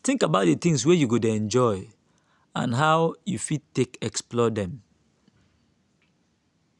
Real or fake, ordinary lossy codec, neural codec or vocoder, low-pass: real; none; none; 10.8 kHz